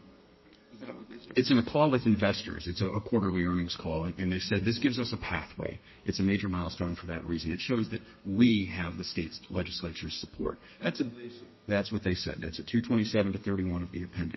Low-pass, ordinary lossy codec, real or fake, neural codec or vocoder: 7.2 kHz; MP3, 24 kbps; fake; codec, 32 kHz, 1.9 kbps, SNAC